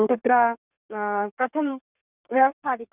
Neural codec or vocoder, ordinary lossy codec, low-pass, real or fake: codec, 32 kHz, 1.9 kbps, SNAC; none; 3.6 kHz; fake